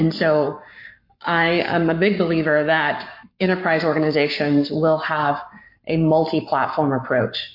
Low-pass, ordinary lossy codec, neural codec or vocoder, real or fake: 5.4 kHz; MP3, 32 kbps; codec, 44.1 kHz, 7.8 kbps, Pupu-Codec; fake